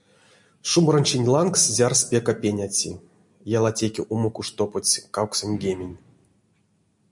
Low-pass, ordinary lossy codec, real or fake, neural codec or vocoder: 10.8 kHz; MP3, 96 kbps; real; none